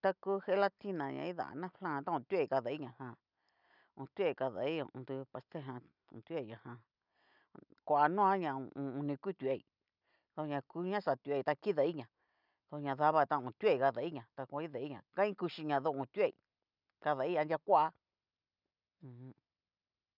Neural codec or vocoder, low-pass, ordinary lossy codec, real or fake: none; 5.4 kHz; none; real